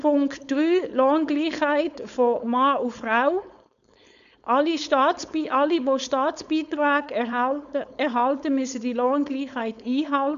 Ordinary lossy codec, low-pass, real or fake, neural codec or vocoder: none; 7.2 kHz; fake; codec, 16 kHz, 4.8 kbps, FACodec